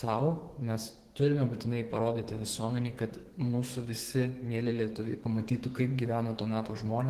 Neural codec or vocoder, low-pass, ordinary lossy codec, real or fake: codec, 32 kHz, 1.9 kbps, SNAC; 14.4 kHz; Opus, 16 kbps; fake